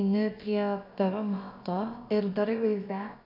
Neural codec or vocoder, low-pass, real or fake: codec, 16 kHz, about 1 kbps, DyCAST, with the encoder's durations; 5.4 kHz; fake